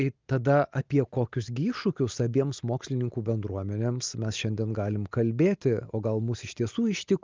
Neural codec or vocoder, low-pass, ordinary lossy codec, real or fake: none; 7.2 kHz; Opus, 24 kbps; real